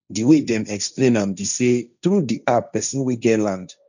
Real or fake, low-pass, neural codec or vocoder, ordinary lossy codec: fake; 7.2 kHz; codec, 16 kHz, 1.1 kbps, Voila-Tokenizer; none